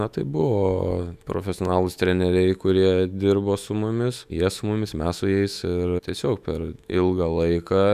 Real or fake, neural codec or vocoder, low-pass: fake; autoencoder, 48 kHz, 128 numbers a frame, DAC-VAE, trained on Japanese speech; 14.4 kHz